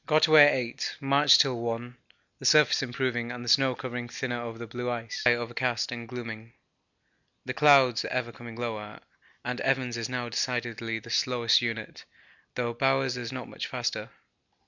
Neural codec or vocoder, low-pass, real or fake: none; 7.2 kHz; real